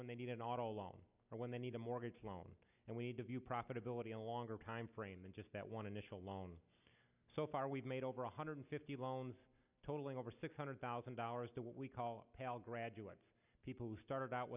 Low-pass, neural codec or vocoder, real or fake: 3.6 kHz; none; real